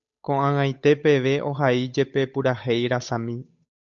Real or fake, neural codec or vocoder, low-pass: fake; codec, 16 kHz, 8 kbps, FunCodec, trained on Chinese and English, 25 frames a second; 7.2 kHz